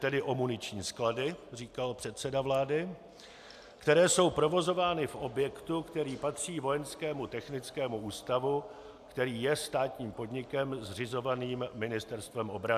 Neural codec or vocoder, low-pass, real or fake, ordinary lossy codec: none; 14.4 kHz; real; AAC, 96 kbps